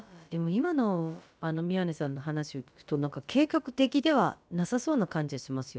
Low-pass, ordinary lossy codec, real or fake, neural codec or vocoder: none; none; fake; codec, 16 kHz, about 1 kbps, DyCAST, with the encoder's durations